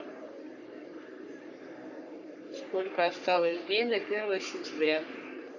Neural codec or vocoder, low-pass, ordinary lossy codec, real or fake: codec, 44.1 kHz, 3.4 kbps, Pupu-Codec; 7.2 kHz; none; fake